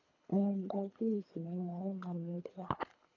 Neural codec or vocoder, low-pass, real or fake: codec, 24 kHz, 3 kbps, HILCodec; 7.2 kHz; fake